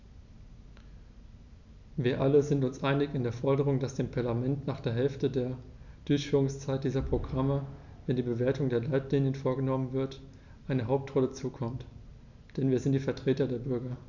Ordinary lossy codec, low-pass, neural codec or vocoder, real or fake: none; 7.2 kHz; none; real